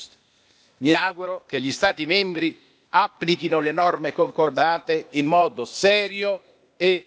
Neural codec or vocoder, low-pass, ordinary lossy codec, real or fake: codec, 16 kHz, 0.8 kbps, ZipCodec; none; none; fake